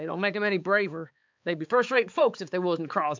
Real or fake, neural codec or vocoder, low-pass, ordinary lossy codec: fake; codec, 16 kHz, 4 kbps, X-Codec, HuBERT features, trained on balanced general audio; 7.2 kHz; MP3, 64 kbps